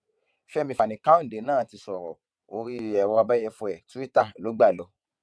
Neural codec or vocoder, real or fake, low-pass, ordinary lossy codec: vocoder, 22.05 kHz, 80 mel bands, WaveNeXt; fake; none; none